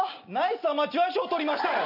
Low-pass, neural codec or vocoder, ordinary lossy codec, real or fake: 5.4 kHz; none; none; real